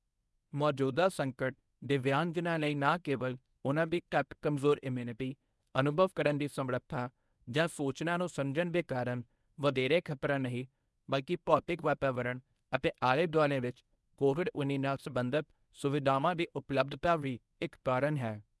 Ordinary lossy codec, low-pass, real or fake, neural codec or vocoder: none; none; fake; codec, 24 kHz, 0.9 kbps, WavTokenizer, medium speech release version 1